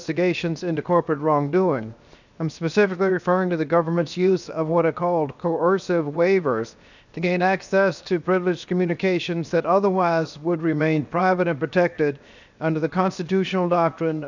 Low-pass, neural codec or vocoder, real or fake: 7.2 kHz; codec, 16 kHz, 0.7 kbps, FocalCodec; fake